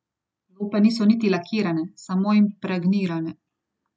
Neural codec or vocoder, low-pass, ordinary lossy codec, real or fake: none; none; none; real